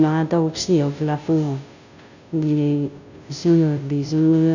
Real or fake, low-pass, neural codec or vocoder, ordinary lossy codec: fake; 7.2 kHz; codec, 16 kHz, 0.5 kbps, FunCodec, trained on Chinese and English, 25 frames a second; none